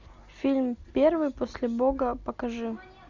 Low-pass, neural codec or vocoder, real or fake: 7.2 kHz; none; real